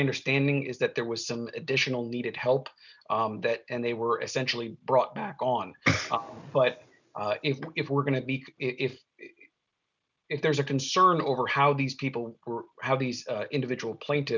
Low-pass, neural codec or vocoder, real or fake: 7.2 kHz; none; real